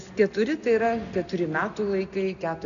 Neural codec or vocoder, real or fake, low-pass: none; real; 7.2 kHz